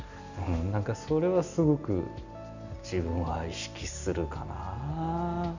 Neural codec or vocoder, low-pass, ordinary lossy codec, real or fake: none; 7.2 kHz; none; real